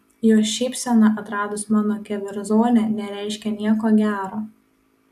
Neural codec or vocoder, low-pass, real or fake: none; 14.4 kHz; real